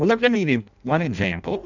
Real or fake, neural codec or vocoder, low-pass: fake; codec, 16 kHz in and 24 kHz out, 0.6 kbps, FireRedTTS-2 codec; 7.2 kHz